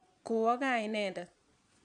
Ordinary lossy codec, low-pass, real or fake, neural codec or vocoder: none; 9.9 kHz; fake; vocoder, 22.05 kHz, 80 mel bands, Vocos